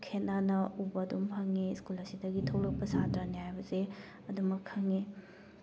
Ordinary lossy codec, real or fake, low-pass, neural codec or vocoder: none; real; none; none